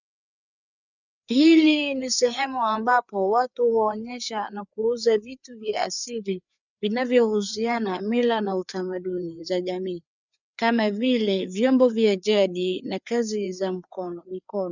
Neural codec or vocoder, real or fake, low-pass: codec, 16 kHz, 4 kbps, FreqCodec, larger model; fake; 7.2 kHz